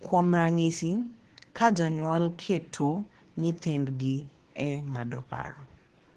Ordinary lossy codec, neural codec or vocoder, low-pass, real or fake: Opus, 16 kbps; codec, 24 kHz, 1 kbps, SNAC; 10.8 kHz; fake